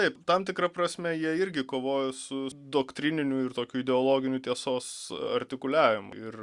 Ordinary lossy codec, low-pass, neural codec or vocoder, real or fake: Opus, 64 kbps; 10.8 kHz; none; real